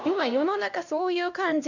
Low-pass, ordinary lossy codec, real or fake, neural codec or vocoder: 7.2 kHz; none; fake; codec, 16 kHz, 1 kbps, X-Codec, HuBERT features, trained on LibriSpeech